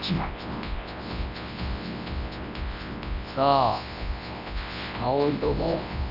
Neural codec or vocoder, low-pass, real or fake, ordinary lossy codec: codec, 24 kHz, 0.9 kbps, WavTokenizer, large speech release; 5.4 kHz; fake; none